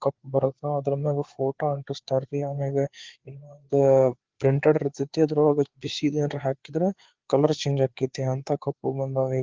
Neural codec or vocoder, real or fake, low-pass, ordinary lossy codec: codec, 16 kHz, 4 kbps, FreqCodec, larger model; fake; 7.2 kHz; Opus, 16 kbps